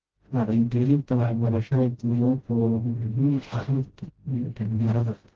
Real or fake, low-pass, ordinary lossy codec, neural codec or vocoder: fake; 7.2 kHz; Opus, 16 kbps; codec, 16 kHz, 0.5 kbps, FreqCodec, smaller model